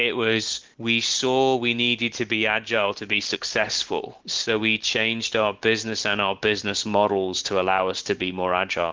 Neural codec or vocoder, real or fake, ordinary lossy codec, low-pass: none; real; Opus, 16 kbps; 7.2 kHz